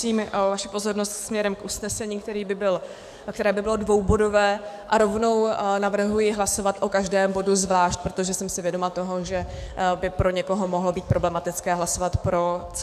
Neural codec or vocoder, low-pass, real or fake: codec, 44.1 kHz, 7.8 kbps, DAC; 14.4 kHz; fake